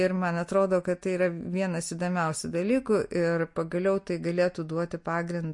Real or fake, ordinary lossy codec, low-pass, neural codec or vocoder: real; MP3, 48 kbps; 10.8 kHz; none